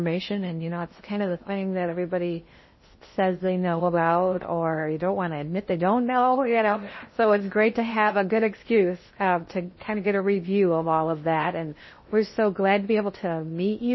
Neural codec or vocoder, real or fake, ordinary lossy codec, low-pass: codec, 16 kHz in and 24 kHz out, 0.6 kbps, FocalCodec, streaming, 2048 codes; fake; MP3, 24 kbps; 7.2 kHz